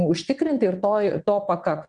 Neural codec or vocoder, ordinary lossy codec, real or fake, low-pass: vocoder, 22.05 kHz, 80 mel bands, WaveNeXt; Opus, 64 kbps; fake; 9.9 kHz